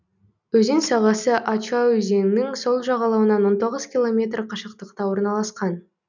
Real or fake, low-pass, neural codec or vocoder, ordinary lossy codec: real; 7.2 kHz; none; none